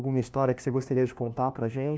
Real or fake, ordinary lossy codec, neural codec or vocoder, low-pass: fake; none; codec, 16 kHz, 1 kbps, FunCodec, trained on LibriTTS, 50 frames a second; none